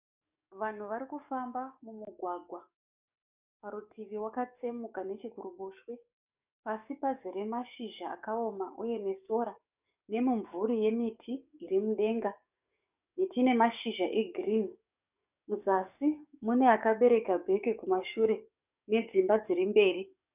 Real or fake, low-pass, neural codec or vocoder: fake; 3.6 kHz; codec, 44.1 kHz, 7.8 kbps, DAC